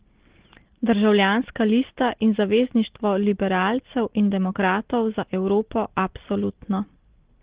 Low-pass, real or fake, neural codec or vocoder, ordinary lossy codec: 3.6 kHz; real; none; Opus, 16 kbps